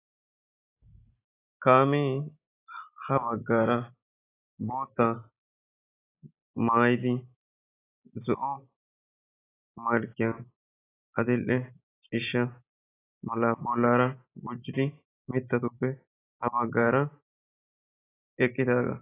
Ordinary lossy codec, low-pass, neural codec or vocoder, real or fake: AAC, 16 kbps; 3.6 kHz; none; real